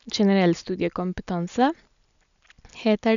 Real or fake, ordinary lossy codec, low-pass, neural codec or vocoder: real; none; 7.2 kHz; none